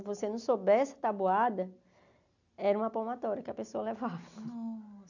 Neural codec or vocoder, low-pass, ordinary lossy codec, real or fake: none; 7.2 kHz; none; real